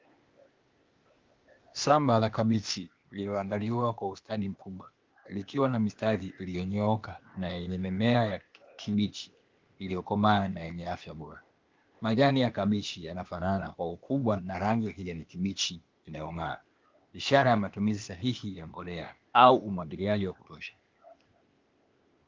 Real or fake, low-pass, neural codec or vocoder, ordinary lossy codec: fake; 7.2 kHz; codec, 16 kHz, 0.8 kbps, ZipCodec; Opus, 32 kbps